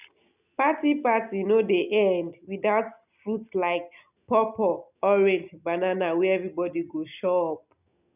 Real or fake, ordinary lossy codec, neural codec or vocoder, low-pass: real; none; none; 3.6 kHz